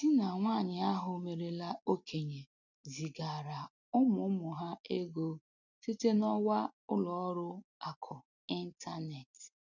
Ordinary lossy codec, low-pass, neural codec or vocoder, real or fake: none; 7.2 kHz; none; real